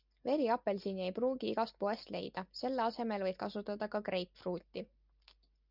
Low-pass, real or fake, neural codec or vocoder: 5.4 kHz; real; none